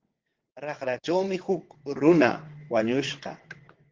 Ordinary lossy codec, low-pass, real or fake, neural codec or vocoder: Opus, 16 kbps; 7.2 kHz; fake; vocoder, 22.05 kHz, 80 mel bands, WaveNeXt